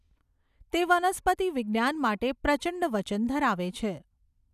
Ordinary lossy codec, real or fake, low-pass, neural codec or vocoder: none; real; 14.4 kHz; none